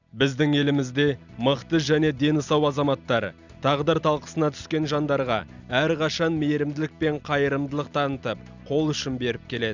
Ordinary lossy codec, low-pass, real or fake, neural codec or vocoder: none; 7.2 kHz; real; none